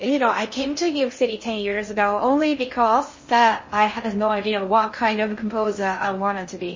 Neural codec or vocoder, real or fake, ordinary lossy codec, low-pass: codec, 16 kHz in and 24 kHz out, 0.6 kbps, FocalCodec, streaming, 2048 codes; fake; MP3, 32 kbps; 7.2 kHz